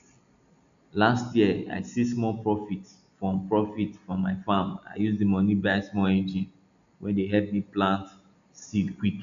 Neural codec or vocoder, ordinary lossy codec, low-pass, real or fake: none; none; 7.2 kHz; real